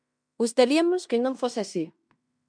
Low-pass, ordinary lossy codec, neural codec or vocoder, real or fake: 9.9 kHz; MP3, 96 kbps; codec, 16 kHz in and 24 kHz out, 0.9 kbps, LongCat-Audio-Codec, fine tuned four codebook decoder; fake